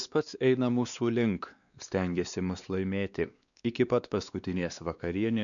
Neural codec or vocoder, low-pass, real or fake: codec, 16 kHz, 2 kbps, X-Codec, WavLM features, trained on Multilingual LibriSpeech; 7.2 kHz; fake